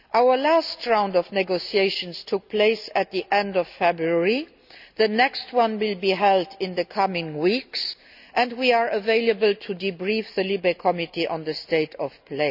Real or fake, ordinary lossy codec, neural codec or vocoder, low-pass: real; none; none; 5.4 kHz